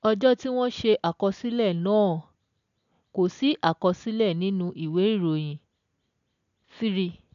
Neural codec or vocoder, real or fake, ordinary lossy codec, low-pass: none; real; none; 7.2 kHz